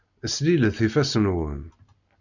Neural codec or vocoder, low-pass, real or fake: none; 7.2 kHz; real